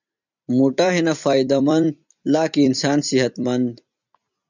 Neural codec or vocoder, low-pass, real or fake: vocoder, 44.1 kHz, 128 mel bands every 256 samples, BigVGAN v2; 7.2 kHz; fake